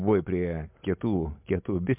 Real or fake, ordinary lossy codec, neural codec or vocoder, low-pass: real; MP3, 32 kbps; none; 3.6 kHz